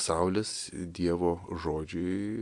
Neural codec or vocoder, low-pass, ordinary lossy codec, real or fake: none; 10.8 kHz; AAC, 64 kbps; real